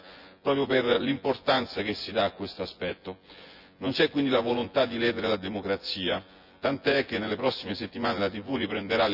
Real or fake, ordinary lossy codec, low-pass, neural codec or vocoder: fake; Opus, 64 kbps; 5.4 kHz; vocoder, 24 kHz, 100 mel bands, Vocos